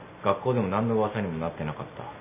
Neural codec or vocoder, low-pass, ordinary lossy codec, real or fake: none; 3.6 kHz; none; real